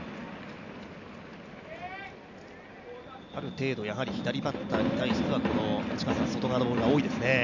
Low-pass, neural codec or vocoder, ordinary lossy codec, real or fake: 7.2 kHz; none; none; real